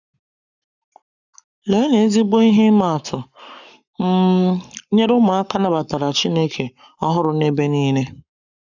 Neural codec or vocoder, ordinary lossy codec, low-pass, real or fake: codec, 44.1 kHz, 7.8 kbps, Pupu-Codec; none; 7.2 kHz; fake